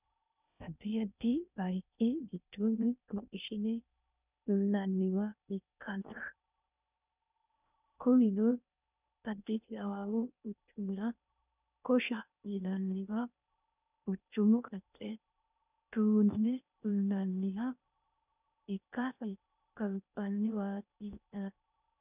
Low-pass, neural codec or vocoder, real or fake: 3.6 kHz; codec, 16 kHz in and 24 kHz out, 0.6 kbps, FocalCodec, streaming, 2048 codes; fake